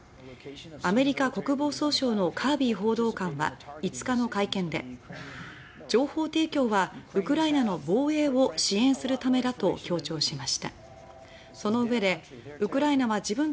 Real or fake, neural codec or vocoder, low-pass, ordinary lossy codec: real; none; none; none